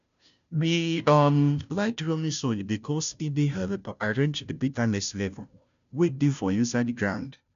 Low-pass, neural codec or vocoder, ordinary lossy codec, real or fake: 7.2 kHz; codec, 16 kHz, 0.5 kbps, FunCodec, trained on Chinese and English, 25 frames a second; AAC, 96 kbps; fake